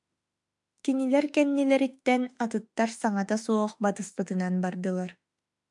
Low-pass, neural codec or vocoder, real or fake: 10.8 kHz; autoencoder, 48 kHz, 32 numbers a frame, DAC-VAE, trained on Japanese speech; fake